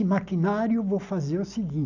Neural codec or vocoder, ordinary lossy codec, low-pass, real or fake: none; none; 7.2 kHz; real